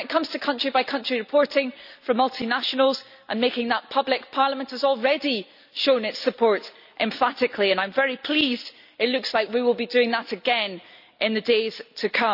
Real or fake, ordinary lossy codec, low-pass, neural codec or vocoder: real; none; 5.4 kHz; none